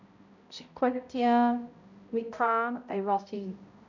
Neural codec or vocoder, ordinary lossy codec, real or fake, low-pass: codec, 16 kHz, 0.5 kbps, X-Codec, HuBERT features, trained on balanced general audio; none; fake; 7.2 kHz